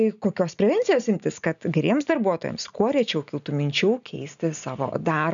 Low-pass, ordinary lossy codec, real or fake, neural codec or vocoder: 7.2 kHz; MP3, 96 kbps; real; none